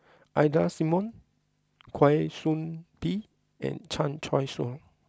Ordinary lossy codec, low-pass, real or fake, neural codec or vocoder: none; none; real; none